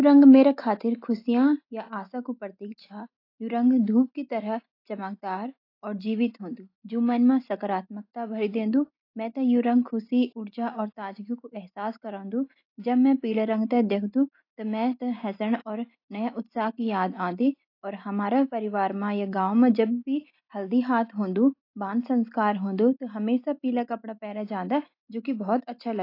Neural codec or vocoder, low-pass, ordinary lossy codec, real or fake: none; 5.4 kHz; AAC, 32 kbps; real